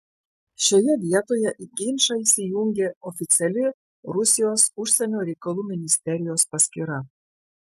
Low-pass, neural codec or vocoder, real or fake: 14.4 kHz; none; real